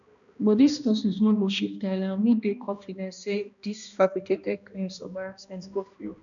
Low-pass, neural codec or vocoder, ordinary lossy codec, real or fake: 7.2 kHz; codec, 16 kHz, 1 kbps, X-Codec, HuBERT features, trained on balanced general audio; none; fake